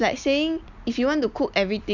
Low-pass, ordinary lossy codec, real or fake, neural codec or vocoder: 7.2 kHz; none; real; none